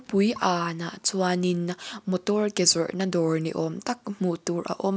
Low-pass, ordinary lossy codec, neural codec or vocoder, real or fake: none; none; none; real